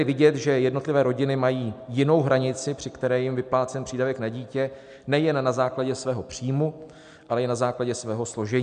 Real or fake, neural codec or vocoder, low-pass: real; none; 9.9 kHz